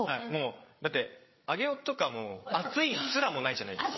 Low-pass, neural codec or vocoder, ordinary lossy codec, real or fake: 7.2 kHz; codec, 16 kHz, 8 kbps, FreqCodec, larger model; MP3, 24 kbps; fake